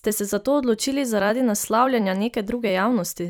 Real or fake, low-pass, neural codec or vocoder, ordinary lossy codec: fake; none; vocoder, 44.1 kHz, 128 mel bands every 256 samples, BigVGAN v2; none